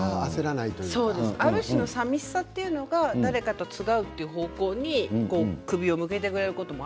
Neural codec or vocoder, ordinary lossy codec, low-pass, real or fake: none; none; none; real